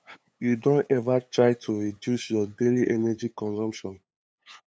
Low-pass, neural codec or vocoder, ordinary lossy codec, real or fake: none; codec, 16 kHz, 8 kbps, FunCodec, trained on LibriTTS, 25 frames a second; none; fake